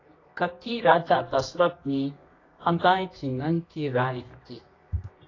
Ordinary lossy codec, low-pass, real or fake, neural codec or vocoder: AAC, 32 kbps; 7.2 kHz; fake; codec, 24 kHz, 0.9 kbps, WavTokenizer, medium music audio release